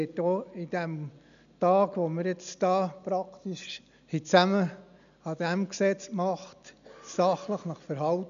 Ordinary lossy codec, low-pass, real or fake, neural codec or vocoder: none; 7.2 kHz; real; none